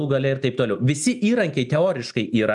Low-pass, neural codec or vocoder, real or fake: 10.8 kHz; none; real